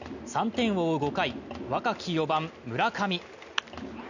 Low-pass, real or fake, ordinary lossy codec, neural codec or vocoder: 7.2 kHz; real; none; none